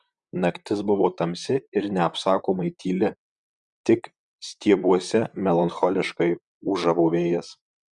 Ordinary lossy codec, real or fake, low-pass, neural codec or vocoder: Opus, 64 kbps; fake; 10.8 kHz; vocoder, 44.1 kHz, 128 mel bands, Pupu-Vocoder